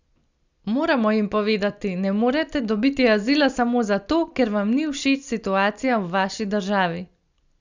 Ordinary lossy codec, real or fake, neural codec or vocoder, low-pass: Opus, 64 kbps; real; none; 7.2 kHz